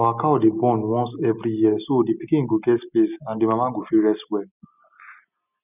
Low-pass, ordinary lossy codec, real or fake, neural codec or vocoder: 3.6 kHz; none; real; none